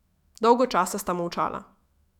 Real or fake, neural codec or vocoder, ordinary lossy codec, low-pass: fake; autoencoder, 48 kHz, 128 numbers a frame, DAC-VAE, trained on Japanese speech; none; 19.8 kHz